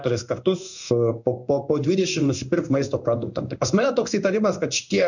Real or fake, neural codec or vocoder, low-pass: fake; codec, 16 kHz in and 24 kHz out, 1 kbps, XY-Tokenizer; 7.2 kHz